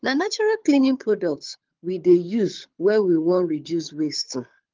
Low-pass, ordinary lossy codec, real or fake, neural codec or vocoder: 7.2 kHz; Opus, 32 kbps; fake; codec, 24 kHz, 6 kbps, HILCodec